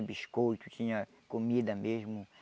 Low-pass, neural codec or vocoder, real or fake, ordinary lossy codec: none; none; real; none